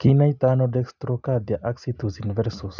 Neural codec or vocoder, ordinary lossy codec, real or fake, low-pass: none; none; real; 7.2 kHz